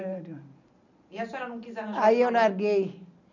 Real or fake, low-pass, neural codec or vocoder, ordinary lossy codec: real; 7.2 kHz; none; none